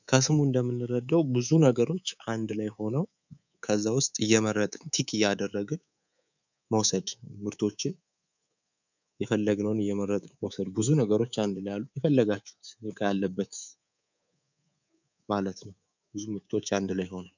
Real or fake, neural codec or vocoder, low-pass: fake; codec, 24 kHz, 3.1 kbps, DualCodec; 7.2 kHz